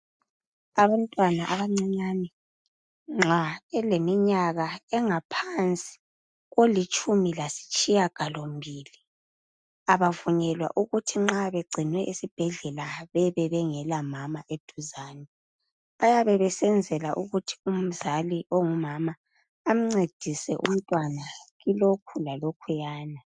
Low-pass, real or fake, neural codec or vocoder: 9.9 kHz; real; none